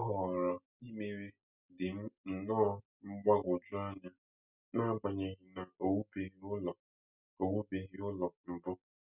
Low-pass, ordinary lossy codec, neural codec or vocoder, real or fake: 3.6 kHz; none; none; real